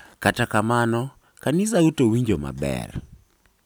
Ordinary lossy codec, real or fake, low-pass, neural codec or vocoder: none; real; none; none